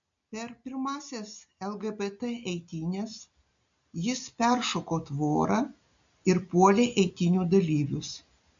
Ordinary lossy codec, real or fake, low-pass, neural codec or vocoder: MP3, 64 kbps; real; 7.2 kHz; none